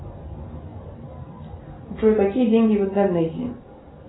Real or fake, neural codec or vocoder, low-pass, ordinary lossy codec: real; none; 7.2 kHz; AAC, 16 kbps